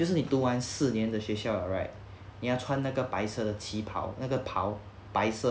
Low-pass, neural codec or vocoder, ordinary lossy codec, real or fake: none; none; none; real